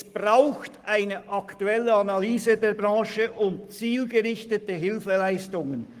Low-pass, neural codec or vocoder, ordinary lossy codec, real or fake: 14.4 kHz; codec, 44.1 kHz, 7.8 kbps, Pupu-Codec; Opus, 24 kbps; fake